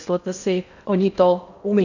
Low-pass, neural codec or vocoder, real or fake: 7.2 kHz; codec, 16 kHz in and 24 kHz out, 0.6 kbps, FocalCodec, streaming, 2048 codes; fake